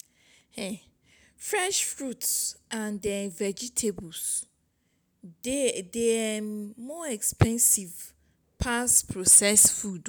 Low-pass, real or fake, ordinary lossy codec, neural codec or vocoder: none; real; none; none